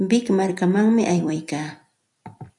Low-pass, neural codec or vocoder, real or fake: 10.8 kHz; vocoder, 44.1 kHz, 128 mel bands every 256 samples, BigVGAN v2; fake